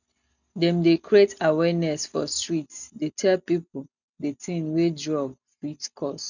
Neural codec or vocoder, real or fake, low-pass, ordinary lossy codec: none; real; 7.2 kHz; none